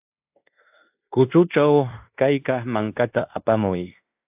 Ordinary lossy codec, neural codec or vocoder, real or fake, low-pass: AAC, 32 kbps; codec, 16 kHz in and 24 kHz out, 0.9 kbps, LongCat-Audio-Codec, fine tuned four codebook decoder; fake; 3.6 kHz